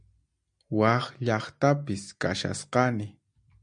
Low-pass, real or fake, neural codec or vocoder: 9.9 kHz; real; none